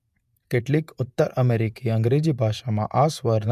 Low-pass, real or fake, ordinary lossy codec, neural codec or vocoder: 14.4 kHz; fake; MP3, 96 kbps; vocoder, 48 kHz, 128 mel bands, Vocos